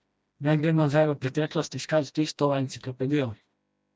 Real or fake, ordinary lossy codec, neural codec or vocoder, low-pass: fake; none; codec, 16 kHz, 1 kbps, FreqCodec, smaller model; none